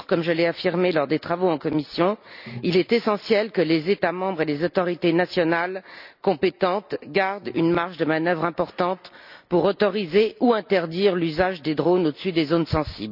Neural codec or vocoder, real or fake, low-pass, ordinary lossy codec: none; real; 5.4 kHz; none